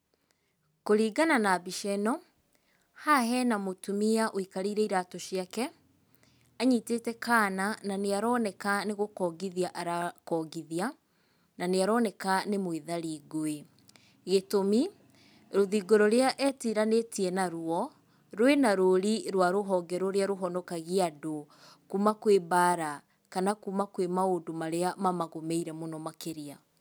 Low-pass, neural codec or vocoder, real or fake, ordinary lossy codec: none; none; real; none